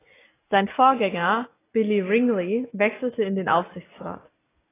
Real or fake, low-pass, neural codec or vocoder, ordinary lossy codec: real; 3.6 kHz; none; AAC, 16 kbps